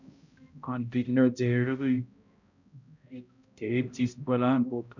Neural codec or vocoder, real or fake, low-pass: codec, 16 kHz, 0.5 kbps, X-Codec, HuBERT features, trained on balanced general audio; fake; 7.2 kHz